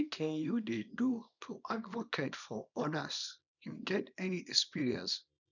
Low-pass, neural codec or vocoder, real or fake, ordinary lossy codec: 7.2 kHz; codec, 24 kHz, 0.9 kbps, WavTokenizer, small release; fake; none